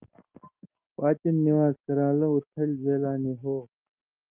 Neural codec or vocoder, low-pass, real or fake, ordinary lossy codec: none; 3.6 kHz; real; Opus, 32 kbps